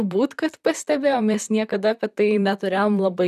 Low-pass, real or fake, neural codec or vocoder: 14.4 kHz; fake; vocoder, 44.1 kHz, 128 mel bands, Pupu-Vocoder